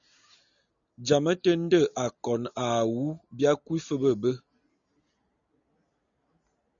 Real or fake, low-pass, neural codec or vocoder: real; 7.2 kHz; none